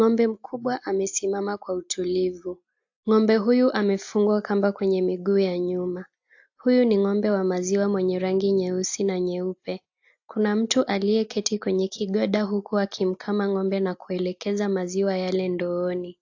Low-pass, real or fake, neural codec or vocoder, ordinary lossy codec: 7.2 kHz; real; none; AAC, 48 kbps